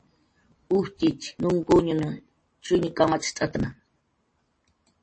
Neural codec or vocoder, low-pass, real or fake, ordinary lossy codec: none; 9.9 kHz; real; MP3, 32 kbps